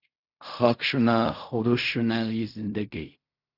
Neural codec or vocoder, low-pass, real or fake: codec, 16 kHz in and 24 kHz out, 0.4 kbps, LongCat-Audio-Codec, fine tuned four codebook decoder; 5.4 kHz; fake